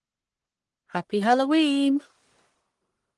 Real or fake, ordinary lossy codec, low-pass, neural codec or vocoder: fake; Opus, 24 kbps; 10.8 kHz; codec, 44.1 kHz, 1.7 kbps, Pupu-Codec